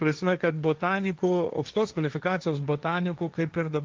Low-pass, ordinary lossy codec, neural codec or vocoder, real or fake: 7.2 kHz; Opus, 32 kbps; codec, 16 kHz, 1.1 kbps, Voila-Tokenizer; fake